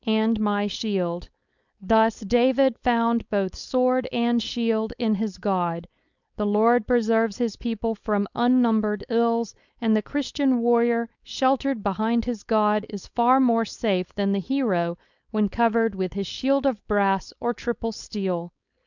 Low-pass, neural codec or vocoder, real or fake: 7.2 kHz; codec, 16 kHz, 4.8 kbps, FACodec; fake